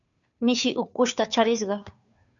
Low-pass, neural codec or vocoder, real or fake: 7.2 kHz; codec, 16 kHz, 2 kbps, FunCodec, trained on Chinese and English, 25 frames a second; fake